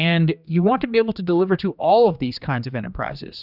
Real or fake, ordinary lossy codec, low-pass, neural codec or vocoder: fake; Opus, 64 kbps; 5.4 kHz; codec, 16 kHz, 2 kbps, X-Codec, HuBERT features, trained on general audio